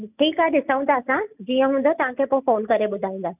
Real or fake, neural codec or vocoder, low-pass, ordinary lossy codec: real; none; 3.6 kHz; none